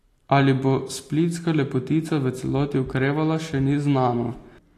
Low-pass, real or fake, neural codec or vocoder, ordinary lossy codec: 14.4 kHz; real; none; AAC, 48 kbps